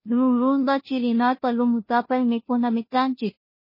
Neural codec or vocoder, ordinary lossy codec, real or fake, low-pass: codec, 16 kHz, 0.5 kbps, FunCodec, trained on Chinese and English, 25 frames a second; MP3, 24 kbps; fake; 5.4 kHz